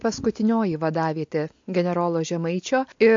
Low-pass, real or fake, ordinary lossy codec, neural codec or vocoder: 7.2 kHz; real; MP3, 48 kbps; none